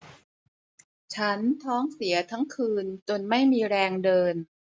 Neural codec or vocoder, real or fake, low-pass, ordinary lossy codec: none; real; none; none